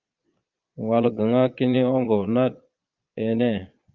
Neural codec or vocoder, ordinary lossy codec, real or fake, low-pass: vocoder, 22.05 kHz, 80 mel bands, Vocos; Opus, 24 kbps; fake; 7.2 kHz